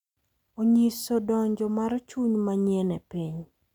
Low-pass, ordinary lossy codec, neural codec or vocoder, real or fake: 19.8 kHz; none; none; real